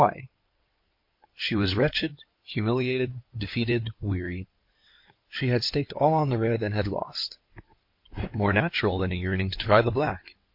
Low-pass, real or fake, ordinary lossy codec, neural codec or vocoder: 5.4 kHz; fake; MP3, 32 kbps; codec, 16 kHz in and 24 kHz out, 2.2 kbps, FireRedTTS-2 codec